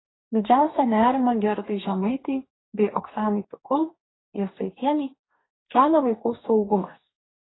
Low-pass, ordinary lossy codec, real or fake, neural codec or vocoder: 7.2 kHz; AAC, 16 kbps; fake; codec, 44.1 kHz, 2.6 kbps, DAC